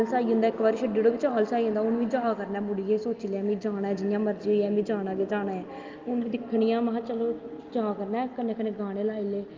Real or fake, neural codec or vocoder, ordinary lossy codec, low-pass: real; none; Opus, 32 kbps; 7.2 kHz